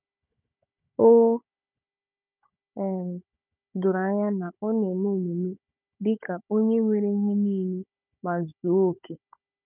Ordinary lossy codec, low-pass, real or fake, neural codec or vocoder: none; 3.6 kHz; fake; codec, 16 kHz, 16 kbps, FunCodec, trained on Chinese and English, 50 frames a second